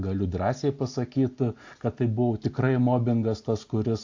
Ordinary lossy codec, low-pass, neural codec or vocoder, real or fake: AAC, 48 kbps; 7.2 kHz; none; real